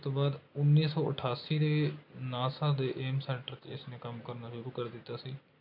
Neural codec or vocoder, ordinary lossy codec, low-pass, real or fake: none; AAC, 48 kbps; 5.4 kHz; real